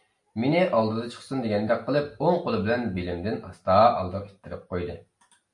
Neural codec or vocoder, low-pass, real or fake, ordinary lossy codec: none; 10.8 kHz; real; MP3, 48 kbps